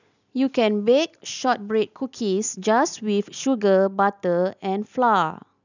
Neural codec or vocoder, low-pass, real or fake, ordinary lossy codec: none; 7.2 kHz; real; none